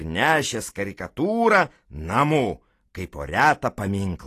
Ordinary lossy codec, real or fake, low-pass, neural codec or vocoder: AAC, 48 kbps; real; 14.4 kHz; none